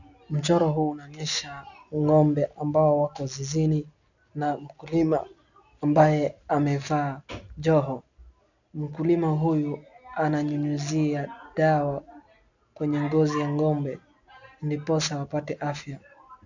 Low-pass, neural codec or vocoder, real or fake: 7.2 kHz; none; real